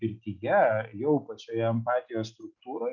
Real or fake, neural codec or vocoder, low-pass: real; none; 7.2 kHz